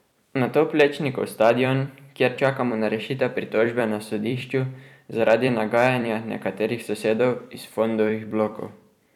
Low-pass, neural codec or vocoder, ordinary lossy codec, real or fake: 19.8 kHz; vocoder, 44.1 kHz, 128 mel bands every 256 samples, BigVGAN v2; none; fake